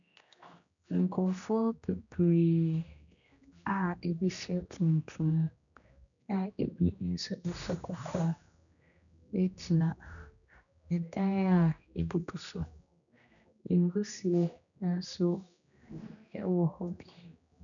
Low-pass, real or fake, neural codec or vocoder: 7.2 kHz; fake; codec, 16 kHz, 1 kbps, X-Codec, HuBERT features, trained on general audio